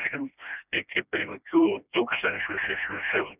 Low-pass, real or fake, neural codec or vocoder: 3.6 kHz; fake; codec, 16 kHz, 1 kbps, FreqCodec, smaller model